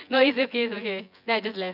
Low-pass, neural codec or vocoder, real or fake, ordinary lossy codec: 5.4 kHz; vocoder, 24 kHz, 100 mel bands, Vocos; fake; none